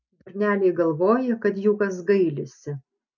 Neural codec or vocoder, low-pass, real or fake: none; 7.2 kHz; real